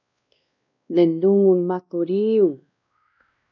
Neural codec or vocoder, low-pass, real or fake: codec, 16 kHz, 1 kbps, X-Codec, WavLM features, trained on Multilingual LibriSpeech; 7.2 kHz; fake